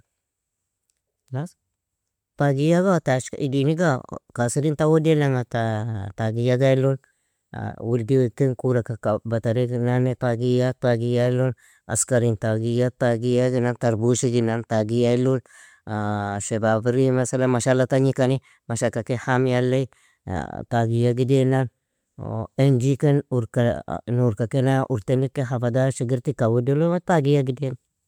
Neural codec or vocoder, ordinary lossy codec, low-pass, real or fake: none; MP3, 96 kbps; 19.8 kHz; real